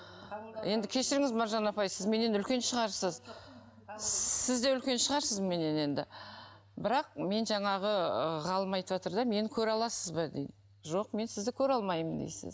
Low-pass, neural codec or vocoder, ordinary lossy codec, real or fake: none; none; none; real